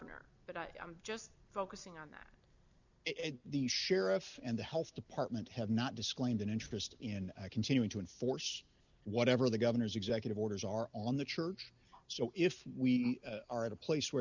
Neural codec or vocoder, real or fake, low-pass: none; real; 7.2 kHz